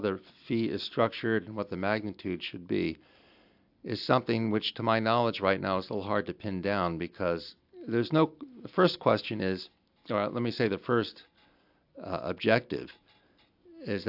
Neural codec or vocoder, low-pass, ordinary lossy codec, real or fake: none; 5.4 kHz; AAC, 48 kbps; real